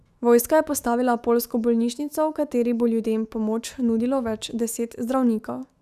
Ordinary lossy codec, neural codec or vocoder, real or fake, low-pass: Opus, 64 kbps; autoencoder, 48 kHz, 128 numbers a frame, DAC-VAE, trained on Japanese speech; fake; 14.4 kHz